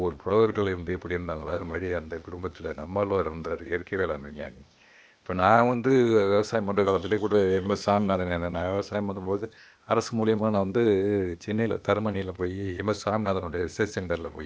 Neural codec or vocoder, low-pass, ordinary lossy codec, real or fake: codec, 16 kHz, 0.8 kbps, ZipCodec; none; none; fake